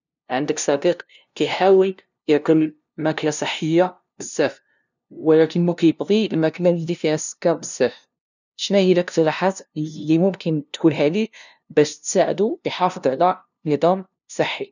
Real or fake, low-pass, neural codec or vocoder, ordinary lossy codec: fake; 7.2 kHz; codec, 16 kHz, 0.5 kbps, FunCodec, trained on LibriTTS, 25 frames a second; none